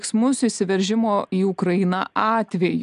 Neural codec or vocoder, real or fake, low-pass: none; real; 10.8 kHz